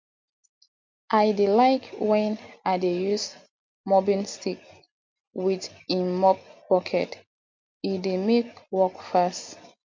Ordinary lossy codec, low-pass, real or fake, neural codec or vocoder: MP3, 48 kbps; 7.2 kHz; real; none